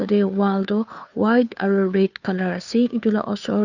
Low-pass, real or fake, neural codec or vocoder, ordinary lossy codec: 7.2 kHz; fake; codec, 16 kHz, 2 kbps, FunCodec, trained on Chinese and English, 25 frames a second; none